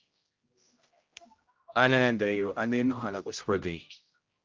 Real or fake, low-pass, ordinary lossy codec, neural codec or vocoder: fake; 7.2 kHz; Opus, 24 kbps; codec, 16 kHz, 0.5 kbps, X-Codec, HuBERT features, trained on general audio